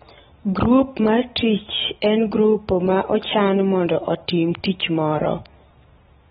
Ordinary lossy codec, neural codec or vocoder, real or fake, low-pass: AAC, 16 kbps; none; real; 19.8 kHz